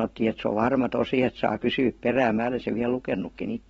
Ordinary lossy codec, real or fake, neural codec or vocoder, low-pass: AAC, 24 kbps; fake; vocoder, 44.1 kHz, 128 mel bands every 512 samples, BigVGAN v2; 19.8 kHz